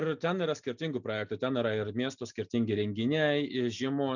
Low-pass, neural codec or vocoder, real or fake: 7.2 kHz; none; real